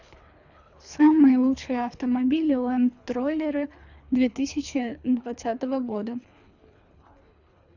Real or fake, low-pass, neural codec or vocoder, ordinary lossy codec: fake; 7.2 kHz; codec, 24 kHz, 3 kbps, HILCodec; none